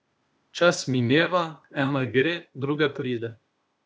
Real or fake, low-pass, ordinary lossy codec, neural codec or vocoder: fake; none; none; codec, 16 kHz, 0.8 kbps, ZipCodec